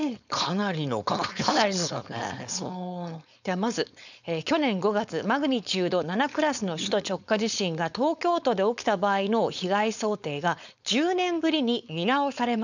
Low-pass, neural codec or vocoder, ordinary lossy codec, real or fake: 7.2 kHz; codec, 16 kHz, 4.8 kbps, FACodec; none; fake